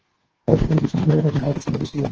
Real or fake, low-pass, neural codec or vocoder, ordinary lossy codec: fake; 7.2 kHz; codec, 24 kHz, 1.2 kbps, DualCodec; Opus, 16 kbps